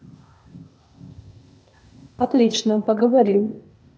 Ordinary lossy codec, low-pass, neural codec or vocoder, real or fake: none; none; codec, 16 kHz, 0.8 kbps, ZipCodec; fake